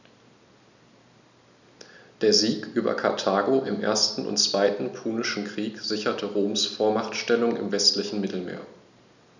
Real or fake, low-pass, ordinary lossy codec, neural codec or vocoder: real; 7.2 kHz; none; none